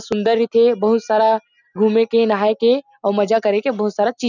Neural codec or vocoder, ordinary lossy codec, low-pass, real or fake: none; none; 7.2 kHz; real